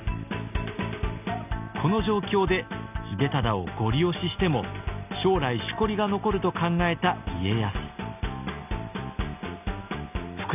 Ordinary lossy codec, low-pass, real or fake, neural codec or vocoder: none; 3.6 kHz; real; none